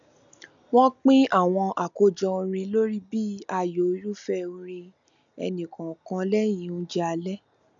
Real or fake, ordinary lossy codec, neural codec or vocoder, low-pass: real; none; none; 7.2 kHz